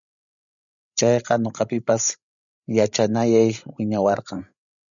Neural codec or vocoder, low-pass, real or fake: codec, 16 kHz, 16 kbps, FreqCodec, larger model; 7.2 kHz; fake